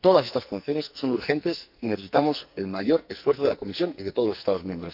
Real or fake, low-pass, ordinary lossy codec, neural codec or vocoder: fake; 5.4 kHz; none; codec, 44.1 kHz, 2.6 kbps, SNAC